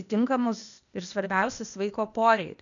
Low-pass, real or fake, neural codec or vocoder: 7.2 kHz; fake; codec, 16 kHz, 0.8 kbps, ZipCodec